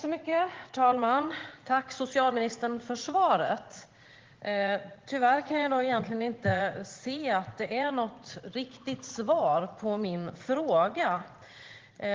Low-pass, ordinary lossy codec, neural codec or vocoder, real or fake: 7.2 kHz; Opus, 16 kbps; vocoder, 44.1 kHz, 80 mel bands, Vocos; fake